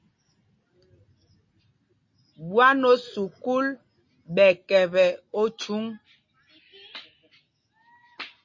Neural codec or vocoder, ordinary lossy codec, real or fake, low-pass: none; MP3, 32 kbps; real; 7.2 kHz